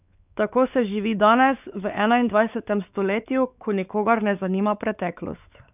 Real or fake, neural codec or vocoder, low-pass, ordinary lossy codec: fake; codec, 16 kHz, 4 kbps, X-Codec, WavLM features, trained on Multilingual LibriSpeech; 3.6 kHz; none